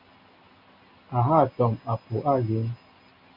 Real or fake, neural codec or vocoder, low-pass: real; none; 5.4 kHz